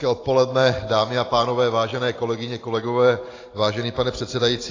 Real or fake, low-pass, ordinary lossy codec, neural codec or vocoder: real; 7.2 kHz; AAC, 32 kbps; none